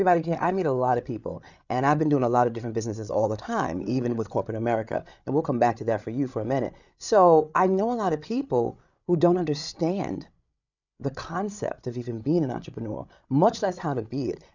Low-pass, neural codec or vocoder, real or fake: 7.2 kHz; codec, 16 kHz, 8 kbps, FreqCodec, larger model; fake